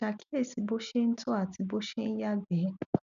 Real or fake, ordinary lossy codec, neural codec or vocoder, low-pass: real; none; none; 7.2 kHz